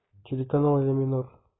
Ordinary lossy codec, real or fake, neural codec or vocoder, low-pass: AAC, 16 kbps; real; none; 7.2 kHz